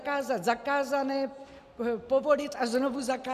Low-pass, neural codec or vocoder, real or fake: 14.4 kHz; none; real